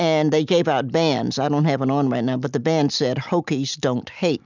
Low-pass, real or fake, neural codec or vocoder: 7.2 kHz; real; none